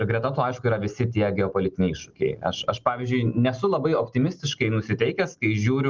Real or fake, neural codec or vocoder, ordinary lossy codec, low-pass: real; none; Opus, 24 kbps; 7.2 kHz